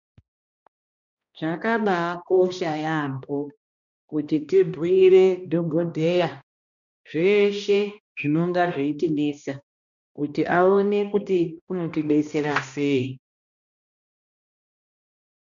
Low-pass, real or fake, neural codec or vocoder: 7.2 kHz; fake; codec, 16 kHz, 1 kbps, X-Codec, HuBERT features, trained on balanced general audio